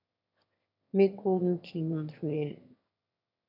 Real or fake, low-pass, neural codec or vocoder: fake; 5.4 kHz; autoencoder, 22.05 kHz, a latent of 192 numbers a frame, VITS, trained on one speaker